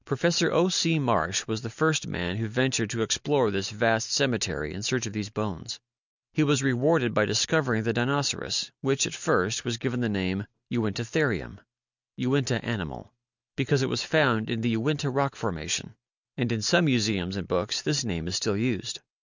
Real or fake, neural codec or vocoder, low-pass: real; none; 7.2 kHz